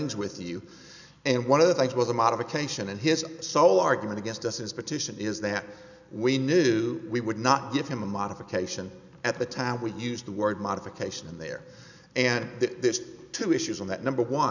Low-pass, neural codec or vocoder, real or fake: 7.2 kHz; none; real